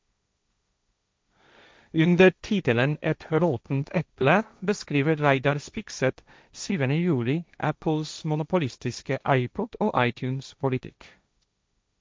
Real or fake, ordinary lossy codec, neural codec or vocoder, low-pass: fake; none; codec, 16 kHz, 1.1 kbps, Voila-Tokenizer; none